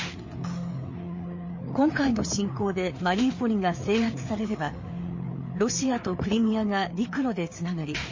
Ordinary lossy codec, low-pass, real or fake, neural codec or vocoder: MP3, 32 kbps; 7.2 kHz; fake; codec, 16 kHz, 4 kbps, FreqCodec, larger model